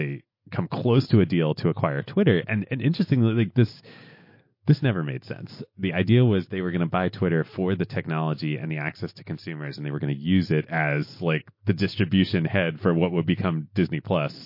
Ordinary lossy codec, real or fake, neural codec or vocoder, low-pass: MP3, 32 kbps; real; none; 5.4 kHz